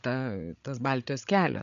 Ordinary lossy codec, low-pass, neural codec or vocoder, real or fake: MP3, 96 kbps; 7.2 kHz; codec, 16 kHz, 16 kbps, FreqCodec, larger model; fake